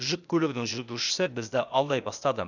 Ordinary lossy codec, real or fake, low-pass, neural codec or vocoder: none; fake; 7.2 kHz; codec, 16 kHz, 0.8 kbps, ZipCodec